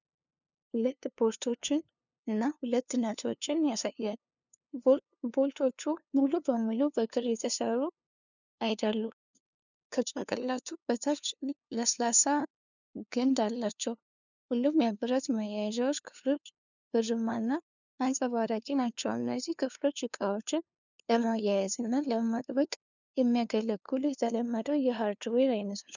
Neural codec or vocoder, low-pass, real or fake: codec, 16 kHz, 2 kbps, FunCodec, trained on LibriTTS, 25 frames a second; 7.2 kHz; fake